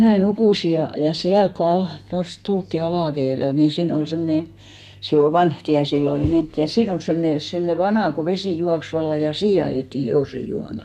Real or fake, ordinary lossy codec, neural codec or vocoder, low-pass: fake; none; codec, 32 kHz, 1.9 kbps, SNAC; 14.4 kHz